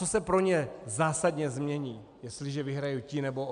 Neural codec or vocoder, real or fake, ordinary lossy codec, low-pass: none; real; MP3, 96 kbps; 9.9 kHz